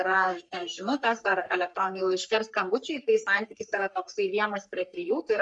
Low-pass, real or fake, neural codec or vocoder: 10.8 kHz; fake; codec, 44.1 kHz, 3.4 kbps, Pupu-Codec